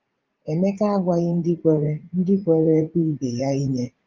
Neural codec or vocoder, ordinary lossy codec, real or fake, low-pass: vocoder, 44.1 kHz, 80 mel bands, Vocos; Opus, 32 kbps; fake; 7.2 kHz